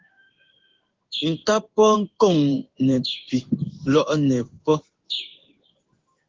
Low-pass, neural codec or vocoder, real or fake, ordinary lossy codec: 7.2 kHz; codec, 16 kHz in and 24 kHz out, 1 kbps, XY-Tokenizer; fake; Opus, 16 kbps